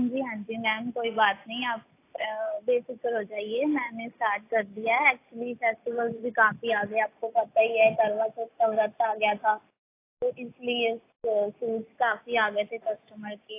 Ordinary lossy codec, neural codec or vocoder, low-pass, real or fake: AAC, 24 kbps; none; 3.6 kHz; real